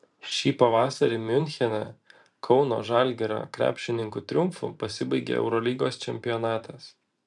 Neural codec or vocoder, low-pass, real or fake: vocoder, 44.1 kHz, 128 mel bands every 512 samples, BigVGAN v2; 10.8 kHz; fake